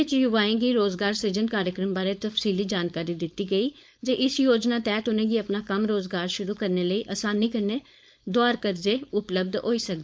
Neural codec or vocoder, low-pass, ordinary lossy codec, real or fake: codec, 16 kHz, 4.8 kbps, FACodec; none; none; fake